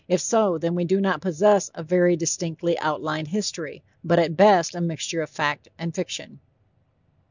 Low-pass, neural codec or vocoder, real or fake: 7.2 kHz; none; real